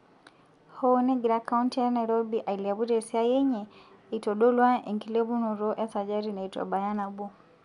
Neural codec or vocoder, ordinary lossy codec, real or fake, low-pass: none; none; real; 10.8 kHz